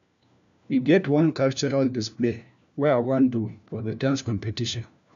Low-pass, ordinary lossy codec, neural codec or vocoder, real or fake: 7.2 kHz; none; codec, 16 kHz, 1 kbps, FunCodec, trained on LibriTTS, 50 frames a second; fake